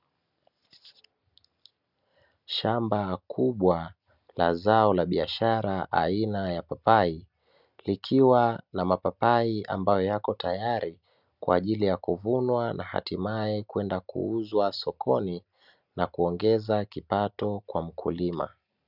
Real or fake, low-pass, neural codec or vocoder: real; 5.4 kHz; none